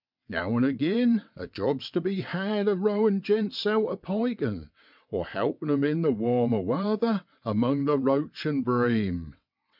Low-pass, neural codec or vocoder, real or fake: 5.4 kHz; vocoder, 22.05 kHz, 80 mel bands, WaveNeXt; fake